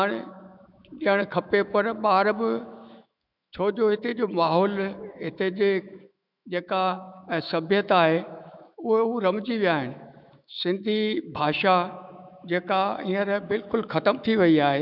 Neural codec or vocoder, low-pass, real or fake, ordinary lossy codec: autoencoder, 48 kHz, 128 numbers a frame, DAC-VAE, trained on Japanese speech; 5.4 kHz; fake; none